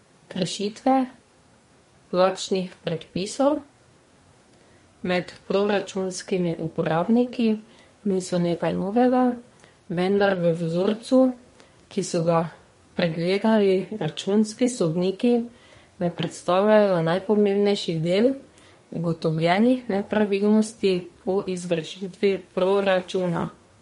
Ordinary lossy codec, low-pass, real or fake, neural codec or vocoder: MP3, 48 kbps; 10.8 kHz; fake; codec, 24 kHz, 1 kbps, SNAC